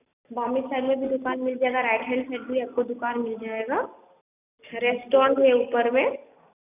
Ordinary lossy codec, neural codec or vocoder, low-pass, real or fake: none; none; 3.6 kHz; real